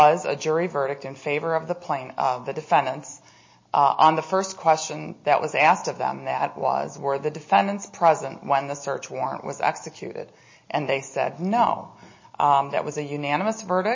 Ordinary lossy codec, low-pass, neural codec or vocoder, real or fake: MP3, 32 kbps; 7.2 kHz; none; real